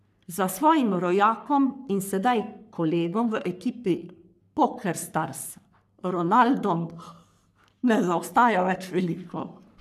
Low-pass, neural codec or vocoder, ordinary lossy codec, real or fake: 14.4 kHz; codec, 44.1 kHz, 3.4 kbps, Pupu-Codec; none; fake